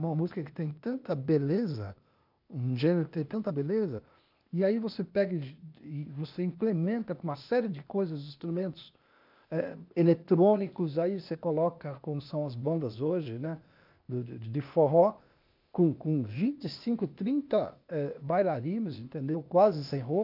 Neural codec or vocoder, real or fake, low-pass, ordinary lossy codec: codec, 16 kHz, 0.8 kbps, ZipCodec; fake; 5.4 kHz; none